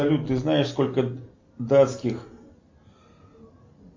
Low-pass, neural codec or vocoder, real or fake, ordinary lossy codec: 7.2 kHz; none; real; MP3, 48 kbps